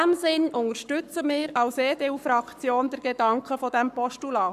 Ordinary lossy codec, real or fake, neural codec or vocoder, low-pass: none; fake; codec, 44.1 kHz, 7.8 kbps, Pupu-Codec; 14.4 kHz